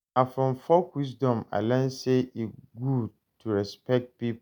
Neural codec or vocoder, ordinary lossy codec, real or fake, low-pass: none; none; real; none